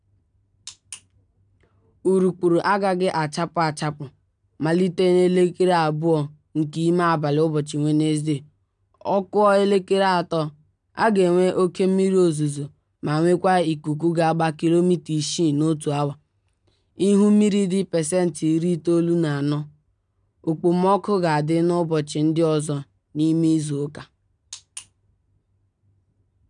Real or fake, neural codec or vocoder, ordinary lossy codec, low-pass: real; none; none; 9.9 kHz